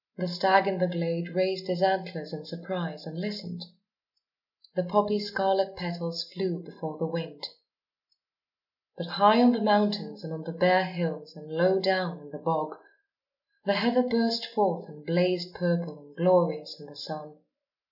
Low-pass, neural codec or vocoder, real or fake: 5.4 kHz; none; real